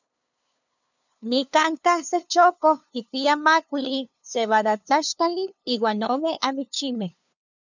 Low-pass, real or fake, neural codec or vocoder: 7.2 kHz; fake; codec, 16 kHz, 2 kbps, FunCodec, trained on LibriTTS, 25 frames a second